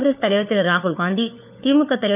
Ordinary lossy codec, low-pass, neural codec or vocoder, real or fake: none; 3.6 kHz; codec, 16 kHz, 4 kbps, FunCodec, trained on LibriTTS, 50 frames a second; fake